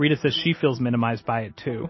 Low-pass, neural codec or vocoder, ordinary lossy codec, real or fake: 7.2 kHz; none; MP3, 24 kbps; real